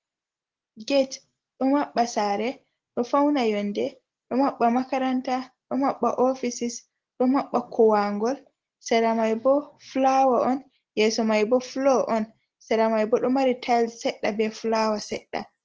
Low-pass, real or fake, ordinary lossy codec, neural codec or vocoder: 7.2 kHz; real; Opus, 16 kbps; none